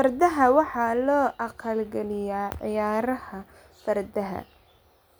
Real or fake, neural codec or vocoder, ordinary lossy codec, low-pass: real; none; none; none